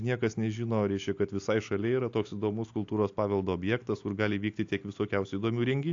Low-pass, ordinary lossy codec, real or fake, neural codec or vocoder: 7.2 kHz; AAC, 48 kbps; real; none